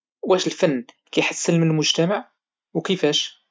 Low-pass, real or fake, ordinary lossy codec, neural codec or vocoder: none; real; none; none